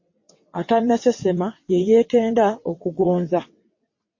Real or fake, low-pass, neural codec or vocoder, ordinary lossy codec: fake; 7.2 kHz; vocoder, 22.05 kHz, 80 mel bands, WaveNeXt; MP3, 32 kbps